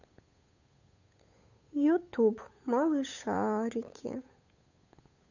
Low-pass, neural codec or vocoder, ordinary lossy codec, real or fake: 7.2 kHz; codec, 16 kHz, 8 kbps, FunCodec, trained on Chinese and English, 25 frames a second; none; fake